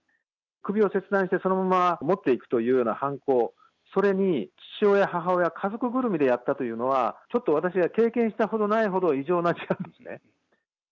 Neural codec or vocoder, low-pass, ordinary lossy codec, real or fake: none; 7.2 kHz; none; real